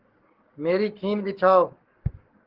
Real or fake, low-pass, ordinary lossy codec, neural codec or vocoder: fake; 5.4 kHz; Opus, 16 kbps; codec, 44.1 kHz, 7.8 kbps, Pupu-Codec